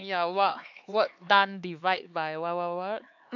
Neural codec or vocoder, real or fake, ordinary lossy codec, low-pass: codec, 16 kHz, 4 kbps, X-Codec, HuBERT features, trained on LibriSpeech; fake; AAC, 48 kbps; 7.2 kHz